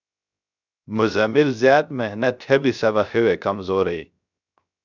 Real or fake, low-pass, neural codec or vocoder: fake; 7.2 kHz; codec, 16 kHz, 0.3 kbps, FocalCodec